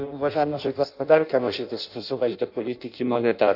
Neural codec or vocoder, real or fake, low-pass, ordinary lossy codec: codec, 16 kHz in and 24 kHz out, 0.6 kbps, FireRedTTS-2 codec; fake; 5.4 kHz; none